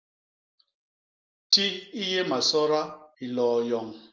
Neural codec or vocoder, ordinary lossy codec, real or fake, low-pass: none; Opus, 32 kbps; real; 7.2 kHz